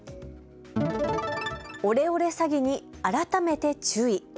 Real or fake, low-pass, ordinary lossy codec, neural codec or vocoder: real; none; none; none